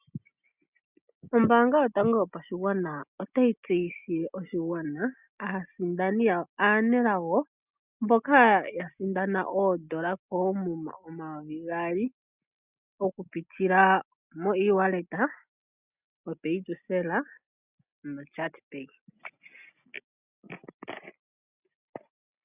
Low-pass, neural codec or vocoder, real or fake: 3.6 kHz; none; real